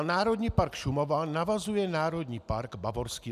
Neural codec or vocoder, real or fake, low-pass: none; real; 14.4 kHz